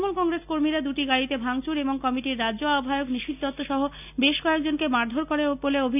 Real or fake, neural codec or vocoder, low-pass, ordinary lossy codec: real; none; 3.6 kHz; none